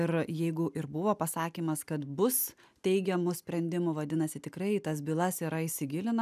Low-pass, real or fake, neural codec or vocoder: 14.4 kHz; real; none